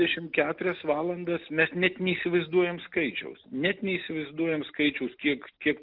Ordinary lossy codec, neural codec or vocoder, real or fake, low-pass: Opus, 32 kbps; none; real; 5.4 kHz